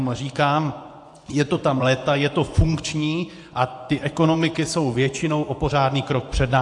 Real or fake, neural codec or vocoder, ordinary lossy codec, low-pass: fake; vocoder, 24 kHz, 100 mel bands, Vocos; AAC, 64 kbps; 10.8 kHz